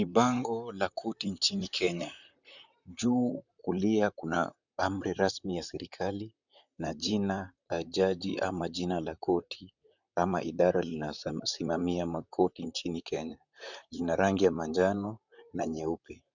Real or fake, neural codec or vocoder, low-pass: fake; vocoder, 44.1 kHz, 128 mel bands, Pupu-Vocoder; 7.2 kHz